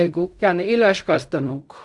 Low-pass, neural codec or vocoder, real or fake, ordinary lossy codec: 10.8 kHz; codec, 16 kHz in and 24 kHz out, 0.4 kbps, LongCat-Audio-Codec, fine tuned four codebook decoder; fake; none